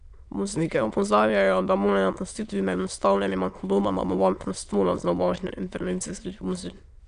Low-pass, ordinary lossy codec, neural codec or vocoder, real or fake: 9.9 kHz; none; autoencoder, 22.05 kHz, a latent of 192 numbers a frame, VITS, trained on many speakers; fake